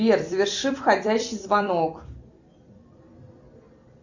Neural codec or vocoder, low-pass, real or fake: vocoder, 44.1 kHz, 128 mel bands every 256 samples, BigVGAN v2; 7.2 kHz; fake